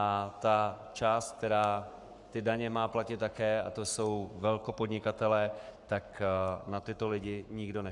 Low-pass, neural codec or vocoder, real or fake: 10.8 kHz; codec, 44.1 kHz, 7.8 kbps, Pupu-Codec; fake